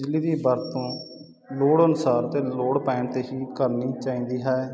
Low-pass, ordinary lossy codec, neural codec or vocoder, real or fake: none; none; none; real